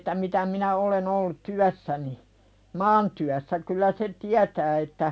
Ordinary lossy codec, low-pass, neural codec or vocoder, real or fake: none; none; none; real